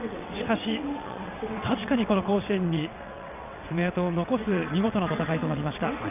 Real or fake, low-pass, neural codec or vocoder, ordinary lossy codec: fake; 3.6 kHz; vocoder, 22.05 kHz, 80 mel bands, WaveNeXt; none